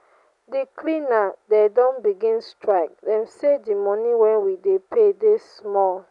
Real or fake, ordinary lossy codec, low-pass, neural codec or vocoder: real; none; 10.8 kHz; none